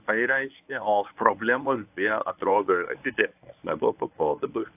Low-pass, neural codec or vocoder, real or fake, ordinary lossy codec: 3.6 kHz; codec, 24 kHz, 0.9 kbps, WavTokenizer, medium speech release version 1; fake; AAC, 32 kbps